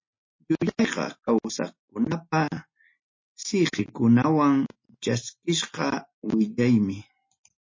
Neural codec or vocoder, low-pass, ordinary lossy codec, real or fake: none; 7.2 kHz; MP3, 32 kbps; real